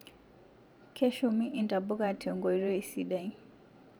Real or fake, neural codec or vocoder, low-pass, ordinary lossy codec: fake; vocoder, 44.1 kHz, 128 mel bands every 512 samples, BigVGAN v2; none; none